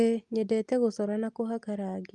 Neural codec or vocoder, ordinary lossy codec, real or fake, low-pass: none; Opus, 32 kbps; real; 10.8 kHz